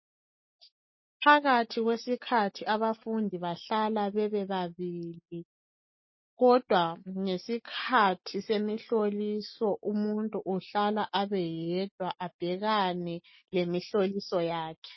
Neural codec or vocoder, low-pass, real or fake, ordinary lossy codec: none; 7.2 kHz; real; MP3, 24 kbps